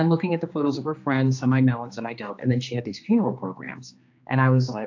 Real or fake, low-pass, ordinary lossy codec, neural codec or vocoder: fake; 7.2 kHz; AAC, 48 kbps; codec, 16 kHz, 2 kbps, X-Codec, HuBERT features, trained on general audio